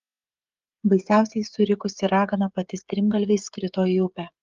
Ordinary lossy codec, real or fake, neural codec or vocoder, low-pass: Opus, 32 kbps; fake; codec, 16 kHz, 16 kbps, FreqCodec, smaller model; 7.2 kHz